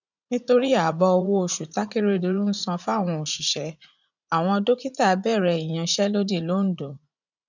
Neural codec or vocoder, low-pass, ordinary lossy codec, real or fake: vocoder, 44.1 kHz, 80 mel bands, Vocos; 7.2 kHz; none; fake